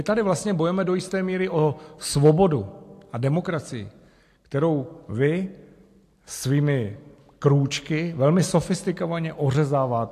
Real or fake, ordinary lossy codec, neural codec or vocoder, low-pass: real; AAC, 64 kbps; none; 14.4 kHz